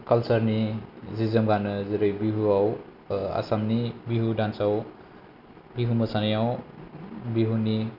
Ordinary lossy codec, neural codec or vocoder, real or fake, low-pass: none; none; real; 5.4 kHz